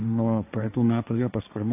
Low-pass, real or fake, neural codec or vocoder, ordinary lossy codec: 3.6 kHz; fake; codec, 16 kHz, 1.1 kbps, Voila-Tokenizer; AAC, 32 kbps